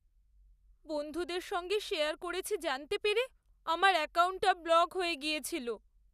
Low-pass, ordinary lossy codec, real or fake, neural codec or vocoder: 14.4 kHz; none; real; none